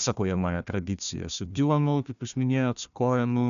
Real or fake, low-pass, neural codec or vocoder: fake; 7.2 kHz; codec, 16 kHz, 1 kbps, FunCodec, trained on Chinese and English, 50 frames a second